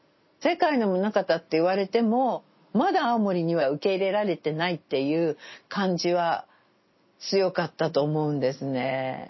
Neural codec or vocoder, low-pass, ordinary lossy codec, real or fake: none; 7.2 kHz; MP3, 24 kbps; real